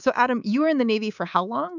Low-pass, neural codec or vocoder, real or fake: 7.2 kHz; codec, 24 kHz, 3.1 kbps, DualCodec; fake